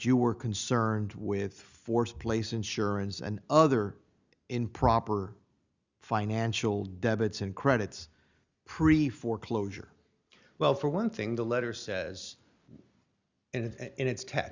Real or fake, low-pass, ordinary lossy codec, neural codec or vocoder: real; 7.2 kHz; Opus, 64 kbps; none